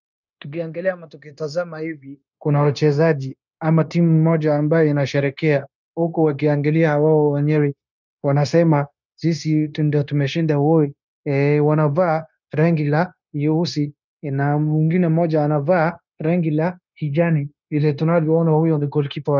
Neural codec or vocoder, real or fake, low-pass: codec, 16 kHz, 0.9 kbps, LongCat-Audio-Codec; fake; 7.2 kHz